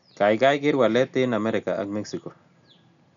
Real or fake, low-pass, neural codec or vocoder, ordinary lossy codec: real; 7.2 kHz; none; none